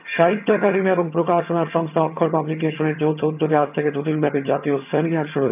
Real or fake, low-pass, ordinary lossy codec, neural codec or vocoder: fake; 3.6 kHz; none; vocoder, 22.05 kHz, 80 mel bands, HiFi-GAN